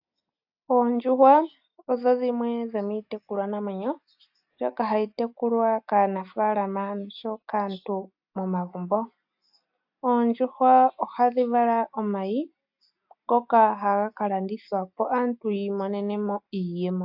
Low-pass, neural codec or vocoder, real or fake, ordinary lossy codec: 5.4 kHz; none; real; AAC, 48 kbps